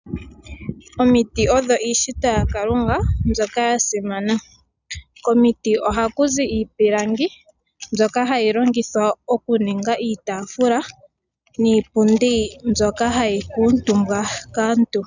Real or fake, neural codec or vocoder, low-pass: real; none; 7.2 kHz